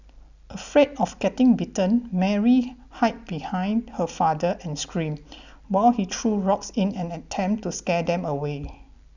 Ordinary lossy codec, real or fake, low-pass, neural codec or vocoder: none; real; 7.2 kHz; none